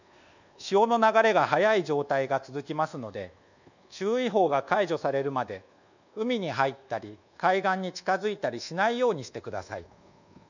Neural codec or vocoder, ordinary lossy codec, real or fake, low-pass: codec, 24 kHz, 1.2 kbps, DualCodec; none; fake; 7.2 kHz